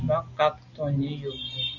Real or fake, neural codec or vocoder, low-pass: real; none; 7.2 kHz